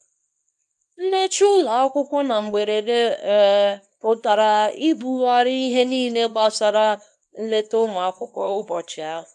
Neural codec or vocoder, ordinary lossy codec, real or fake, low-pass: codec, 24 kHz, 0.9 kbps, WavTokenizer, small release; none; fake; none